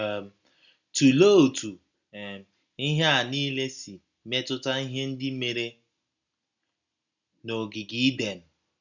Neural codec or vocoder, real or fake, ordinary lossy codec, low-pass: none; real; none; 7.2 kHz